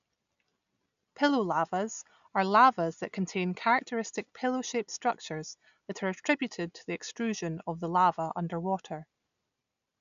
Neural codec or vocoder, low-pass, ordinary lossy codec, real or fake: none; 7.2 kHz; none; real